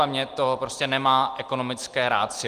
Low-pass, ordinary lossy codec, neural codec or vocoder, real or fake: 14.4 kHz; Opus, 24 kbps; none; real